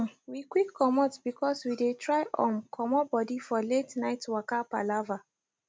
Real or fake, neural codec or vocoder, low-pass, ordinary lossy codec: real; none; none; none